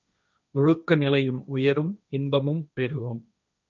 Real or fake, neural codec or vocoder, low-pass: fake; codec, 16 kHz, 1.1 kbps, Voila-Tokenizer; 7.2 kHz